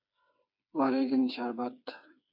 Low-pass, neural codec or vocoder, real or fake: 5.4 kHz; codec, 16 kHz, 4 kbps, FreqCodec, smaller model; fake